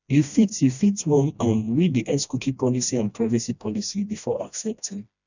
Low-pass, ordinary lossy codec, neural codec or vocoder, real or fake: 7.2 kHz; none; codec, 16 kHz, 1 kbps, FreqCodec, smaller model; fake